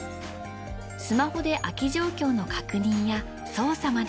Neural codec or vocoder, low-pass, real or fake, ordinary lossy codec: none; none; real; none